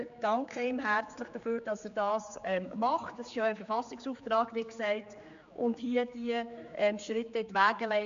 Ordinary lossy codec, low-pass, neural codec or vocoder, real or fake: MP3, 64 kbps; 7.2 kHz; codec, 16 kHz, 4 kbps, X-Codec, HuBERT features, trained on general audio; fake